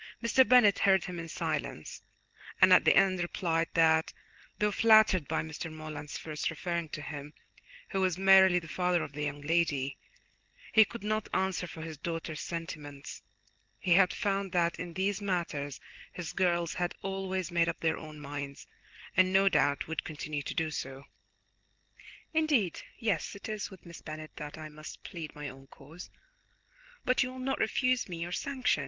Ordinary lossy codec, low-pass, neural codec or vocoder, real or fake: Opus, 16 kbps; 7.2 kHz; none; real